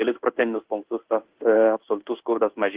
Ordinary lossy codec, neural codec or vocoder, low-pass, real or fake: Opus, 16 kbps; codec, 16 kHz in and 24 kHz out, 1 kbps, XY-Tokenizer; 3.6 kHz; fake